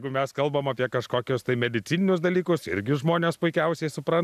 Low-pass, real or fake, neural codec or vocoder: 14.4 kHz; real; none